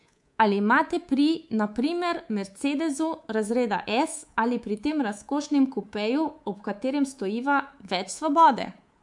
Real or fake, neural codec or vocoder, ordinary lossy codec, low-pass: fake; codec, 24 kHz, 3.1 kbps, DualCodec; MP3, 64 kbps; 10.8 kHz